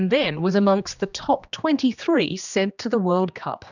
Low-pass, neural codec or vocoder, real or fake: 7.2 kHz; codec, 16 kHz, 2 kbps, X-Codec, HuBERT features, trained on general audio; fake